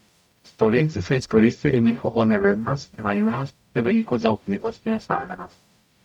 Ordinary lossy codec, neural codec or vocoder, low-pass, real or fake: none; codec, 44.1 kHz, 0.9 kbps, DAC; 19.8 kHz; fake